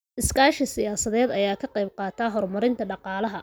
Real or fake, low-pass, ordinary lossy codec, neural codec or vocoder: real; none; none; none